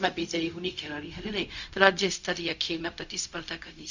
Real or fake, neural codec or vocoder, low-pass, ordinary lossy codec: fake; codec, 16 kHz, 0.4 kbps, LongCat-Audio-Codec; 7.2 kHz; none